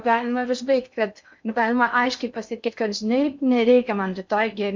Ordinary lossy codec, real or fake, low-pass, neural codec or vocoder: MP3, 64 kbps; fake; 7.2 kHz; codec, 16 kHz in and 24 kHz out, 0.6 kbps, FocalCodec, streaming, 2048 codes